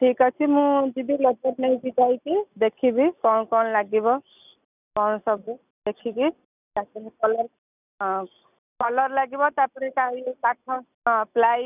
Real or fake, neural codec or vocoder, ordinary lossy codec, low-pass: real; none; none; 3.6 kHz